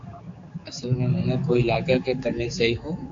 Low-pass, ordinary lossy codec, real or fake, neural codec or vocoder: 7.2 kHz; AAC, 48 kbps; fake; codec, 16 kHz, 4 kbps, X-Codec, HuBERT features, trained on general audio